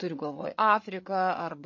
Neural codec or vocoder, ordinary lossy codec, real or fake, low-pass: codec, 16 kHz, 8 kbps, FreqCodec, larger model; MP3, 32 kbps; fake; 7.2 kHz